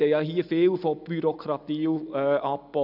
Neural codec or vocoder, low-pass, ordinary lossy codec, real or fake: none; 5.4 kHz; none; real